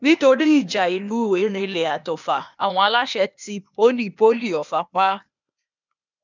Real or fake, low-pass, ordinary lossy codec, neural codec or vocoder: fake; 7.2 kHz; none; codec, 16 kHz, 0.8 kbps, ZipCodec